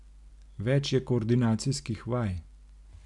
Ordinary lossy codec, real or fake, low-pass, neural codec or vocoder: none; real; 10.8 kHz; none